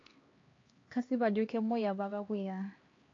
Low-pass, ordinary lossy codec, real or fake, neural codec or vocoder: 7.2 kHz; AAC, 32 kbps; fake; codec, 16 kHz, 2 kbps, X-Codec, HuBERT features, trained on LibriSpeech